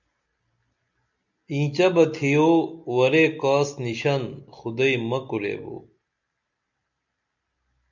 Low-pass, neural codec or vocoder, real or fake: 7.2 kHz; none; real